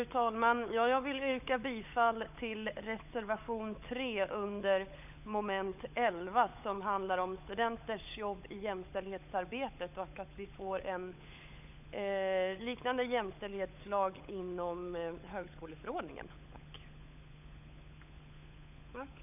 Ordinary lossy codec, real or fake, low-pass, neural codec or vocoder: none; fake; 3.6 kHz; codec, 16 kHz, 16 kbps, FunCodec, trained on LibriTTS, 50 frames a second